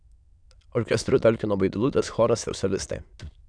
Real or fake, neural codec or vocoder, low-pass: fake; autoencoder, 22.05 kHz, a latent of 192 numbers a frame, VITS, trained on many speakers; 9.9 kHz